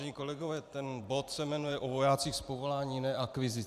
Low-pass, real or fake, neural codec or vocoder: 14.4 kHz; fake; vocoder, 44.1 kHz, 128 mel bands every 512 samples, BigVGAN v2